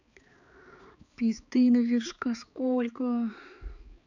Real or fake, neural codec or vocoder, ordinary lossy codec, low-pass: fake; codec, 16 kHz, 4 kbps, X-Codec, HuBERT features, trained on balanced general audio; none; 7.2 kHz